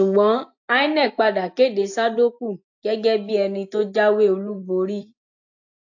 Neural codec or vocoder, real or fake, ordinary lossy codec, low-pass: none; real; AAC, 48 kbps; 7.2 kHz